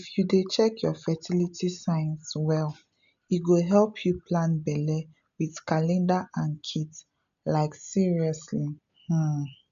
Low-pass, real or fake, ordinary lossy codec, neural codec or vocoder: 7.2 kHz; real; none; none